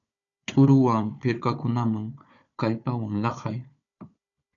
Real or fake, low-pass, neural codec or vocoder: fake; 7.2 kHz; codec, 16 kHz, 4 kbps, FunCodec, trained on Chinese and English, 50 frames a second